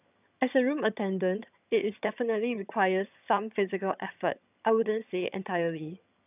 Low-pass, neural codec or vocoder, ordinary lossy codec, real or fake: 3.6 kHz; vocoder, 22.05 kHz, 80 mel bands, HiFi-GAN; none; fake